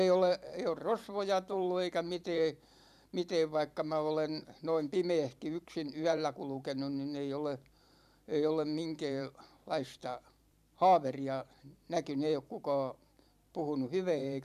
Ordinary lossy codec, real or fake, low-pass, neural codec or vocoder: none; fake; 14.4 kHz; vocoder, 44.1 kHz, 128 mel bands every 512 samples, BigVGAN v2